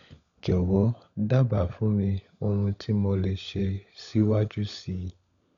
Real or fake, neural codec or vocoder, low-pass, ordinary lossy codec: fake; codec, 16 kHz, 16 kbps, FunCodec, trained on LibriTTS, 50 frames a second; 7.2 kHz; none